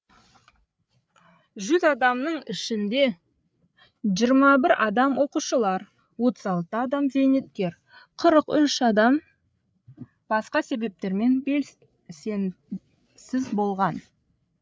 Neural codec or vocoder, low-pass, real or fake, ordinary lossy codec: codec, 16 kHz, 8 kbps, FreqCodec, larger model; none; fake; none